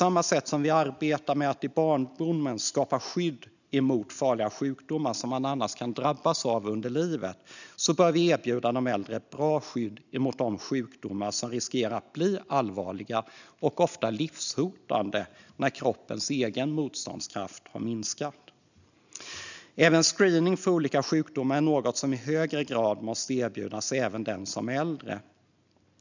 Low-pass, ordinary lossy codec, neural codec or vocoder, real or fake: 7.2 kHz; none; none; real